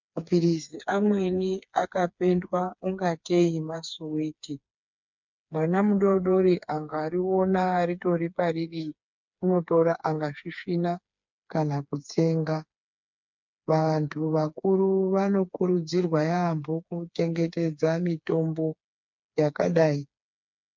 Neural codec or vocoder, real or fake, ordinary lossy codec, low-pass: codec, 16 kHz, 4 kbps, FreqCodec, smaller model; fake; MP3, 64 kbps; 7.2 kHz